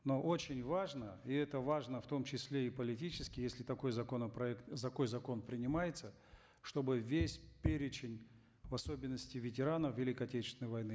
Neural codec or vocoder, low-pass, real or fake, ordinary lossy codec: none; none; real; none